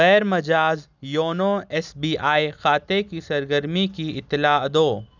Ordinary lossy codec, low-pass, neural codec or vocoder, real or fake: none; 7.2 kHz; none; real